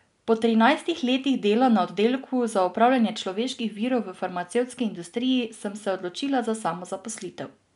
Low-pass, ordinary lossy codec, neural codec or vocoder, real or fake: 10.8 kHz; none; none; real